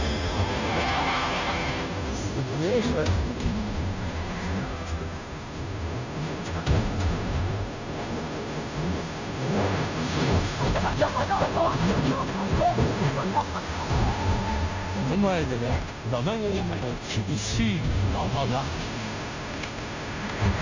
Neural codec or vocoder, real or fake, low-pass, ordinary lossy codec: codec, 16 kHz, 0.5 kbps, FunCodec, trained on Chinese and English, 25 frames a second; fake; 7.2 kHz; AAC, 48 kbps